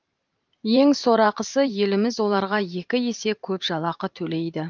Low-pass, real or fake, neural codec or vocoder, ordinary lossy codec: 7.2 kHz; fake; vocoder, 44.1 kHz, 128 mel bands every 512 samples, BigVGAN v2; Opus, 24 kbps